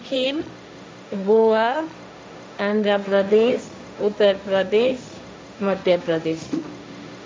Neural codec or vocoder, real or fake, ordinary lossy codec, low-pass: codec, 16 kHz, 1.1 kbps, Voila-Tokenizer; fake; none; none